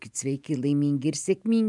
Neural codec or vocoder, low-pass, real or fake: vocoder, 44.1 kHz, 128 mel bands every 512 samples, BigVGAN v2; 10.8 kHz; fake